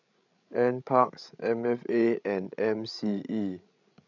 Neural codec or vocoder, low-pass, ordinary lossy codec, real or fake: codec, 16 kHz, 16 kbps, FreqCodec, larger model; 7.2 kHz; none; fake